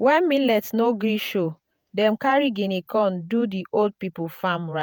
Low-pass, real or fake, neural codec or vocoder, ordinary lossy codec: none; fake; vocoder, 48 kHz, 128 mel bands, Vocos; none